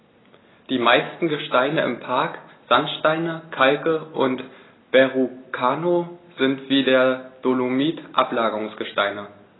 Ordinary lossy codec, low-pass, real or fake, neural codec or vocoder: AAC, 16 kbps; 7.2 kHz; real; none